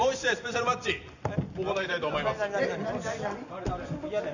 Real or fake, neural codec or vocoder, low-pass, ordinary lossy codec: real; none; 7.2 kHz; none